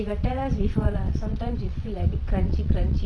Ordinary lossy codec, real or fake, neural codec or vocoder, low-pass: none; fake; vocoder, 22.05 kHz, 80 mel bands, WaveNeXt; none